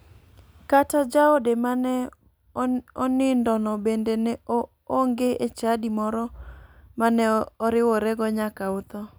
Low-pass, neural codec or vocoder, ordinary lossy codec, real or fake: none; none; none; real